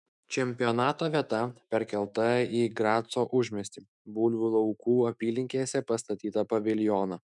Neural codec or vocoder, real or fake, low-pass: autoencoder, 48 kHz, 128 numbers a frame, DAC-VAE, trained on Japanese speech; fake; 10.8 kHz